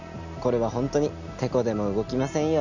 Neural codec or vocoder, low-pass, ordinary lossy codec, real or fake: none; 7.2 kHz; none; real